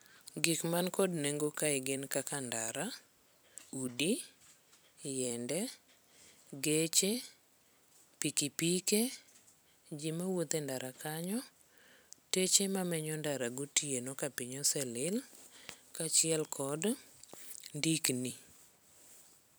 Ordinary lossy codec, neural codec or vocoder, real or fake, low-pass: none; vocoder, 44.1 kHz, 128 mel bands every 256 samples, BigVGAN v2; fake; none